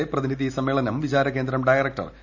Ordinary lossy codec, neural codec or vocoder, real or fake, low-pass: none; none; real; 7.2 kHz